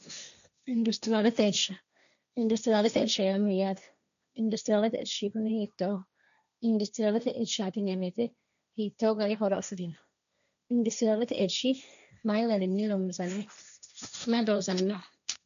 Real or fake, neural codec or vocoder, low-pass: fake; codec, 16 kHz, 1.1 kbps, Voila-Tokenizer; 7.2 kHz